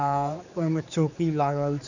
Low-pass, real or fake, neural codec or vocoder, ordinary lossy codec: 7.2 kHz; fake; codec, 16 kHz, 4 kbps, X-Codec, HuBERT features, trained on general audio; none